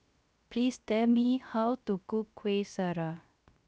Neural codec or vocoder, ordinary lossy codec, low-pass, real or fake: codec, 16 kHz, 0.3 kbps, FocalCodec; none; none; fake